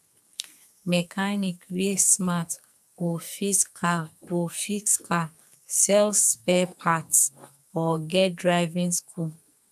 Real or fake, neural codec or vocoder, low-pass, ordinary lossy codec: fake; codec, 44.1 kHz, 2.6 kbps, SNAC; 14.4 kHz; none